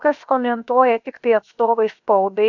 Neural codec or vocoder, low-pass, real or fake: codec, 16 kHz, about 1 kbps, DyCAST, with the encoder's durations; 7.2 kHz; fake